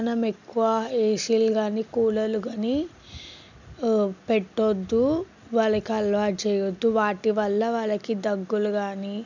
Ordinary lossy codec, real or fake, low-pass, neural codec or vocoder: none; real; 7.2 kHz; none